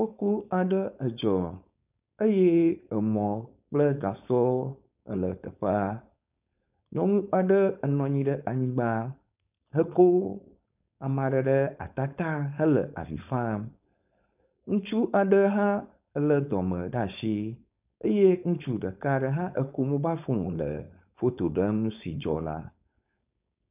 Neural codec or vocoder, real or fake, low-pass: codec, 16 kHz, 4.8 kbps, FACodec; fake; 3.6 kHz